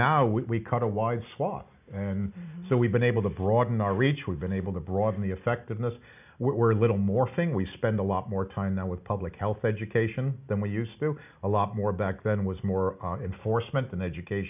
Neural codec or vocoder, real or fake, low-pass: none; real; 3.6 kHz